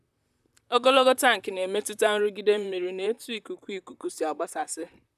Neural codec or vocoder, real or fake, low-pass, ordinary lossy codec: vocoder, 44.1 kHz, 128 mel bands, Pupu-Vocoder; fake; 14.4 kHz; none